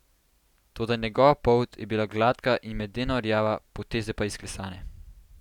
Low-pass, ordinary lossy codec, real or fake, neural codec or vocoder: 19.8 kHz; none; real; none